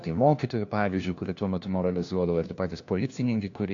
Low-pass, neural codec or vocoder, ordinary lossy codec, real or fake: 7.2 kHz; codec, 16 kHz, 1 kbps, FunCodec, trained on LibriTTS, 50 frames a second; AAC, 48 kbps; fake